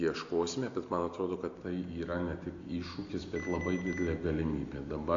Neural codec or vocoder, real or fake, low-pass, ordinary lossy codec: none; real; 7.2 kHz; AAC, 48 kbps